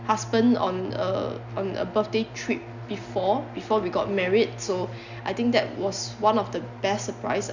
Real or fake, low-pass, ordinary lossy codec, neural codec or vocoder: real; 7.2 kHz; none; none